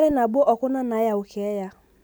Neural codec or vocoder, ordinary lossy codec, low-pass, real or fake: none; none; none; real